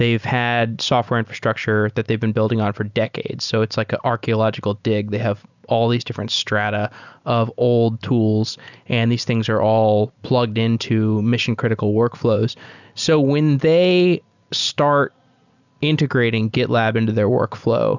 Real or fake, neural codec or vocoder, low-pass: real; none; 7.2 kHz